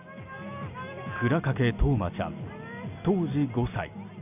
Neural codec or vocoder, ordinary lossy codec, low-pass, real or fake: none; none; 3.6 kHz; real